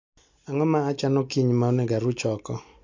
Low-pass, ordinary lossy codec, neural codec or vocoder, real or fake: 7.2 kHz; MP3, 48 kbps; none; real